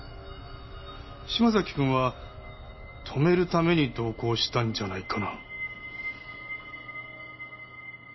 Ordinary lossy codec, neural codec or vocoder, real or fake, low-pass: MP3, 24 kbps; none; real; 7.2 kHz